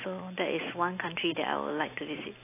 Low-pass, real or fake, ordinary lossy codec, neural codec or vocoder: 3.6 kHz; real; AAC, 24 kbps; none